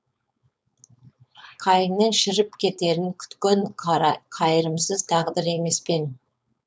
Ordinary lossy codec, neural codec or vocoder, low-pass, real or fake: none; codec, 16 kHz, 4.8 kbps, FACodec; none; fake